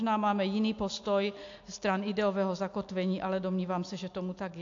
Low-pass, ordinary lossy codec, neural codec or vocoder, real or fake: 7.2 kHz; AAC, 48 kbps; none; real